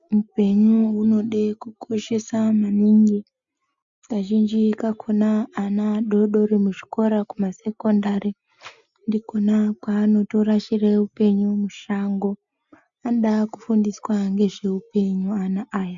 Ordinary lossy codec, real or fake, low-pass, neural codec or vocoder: MP3, 64 kbps; real; 7.2 kHz; none